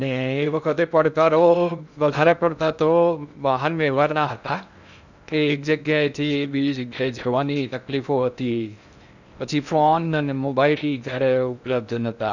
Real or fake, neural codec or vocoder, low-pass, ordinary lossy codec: fake; codec, 16 kHz in and 24 kHz out, 0.6 kbps, FocalCodec, streaming, 2048 codes; 7.2 kHz; none